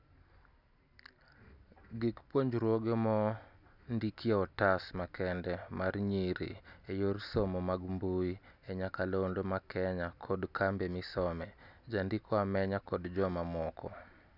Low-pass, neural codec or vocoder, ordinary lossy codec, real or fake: 5.4 kHz; none; none; real